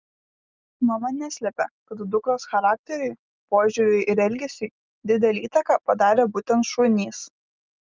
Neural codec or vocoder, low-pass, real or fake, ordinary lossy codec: none; 7.2 kHz; real; Opus, 32 kbps